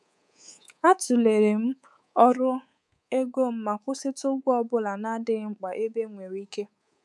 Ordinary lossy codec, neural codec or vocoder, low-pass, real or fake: none; codec, 24 kHz, 3.1 kbps, DualCodec; none; fake